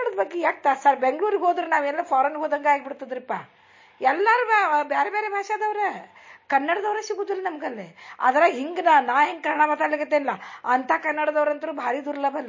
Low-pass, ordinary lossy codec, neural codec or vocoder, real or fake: 7.2 kHz; MP3, 32 kbps; none; real